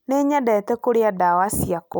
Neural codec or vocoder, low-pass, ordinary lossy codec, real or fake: none; none; none; real